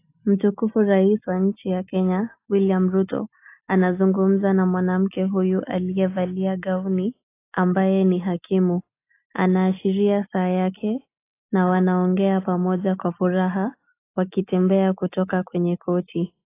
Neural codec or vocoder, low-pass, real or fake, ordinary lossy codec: none; 3.6 kHz; real; AAC, 24 kbps